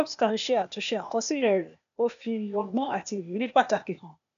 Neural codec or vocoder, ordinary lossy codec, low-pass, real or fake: codec, 16 kHz, 0.8 kbps, ZipCodec; none; 7.2 kHz; fake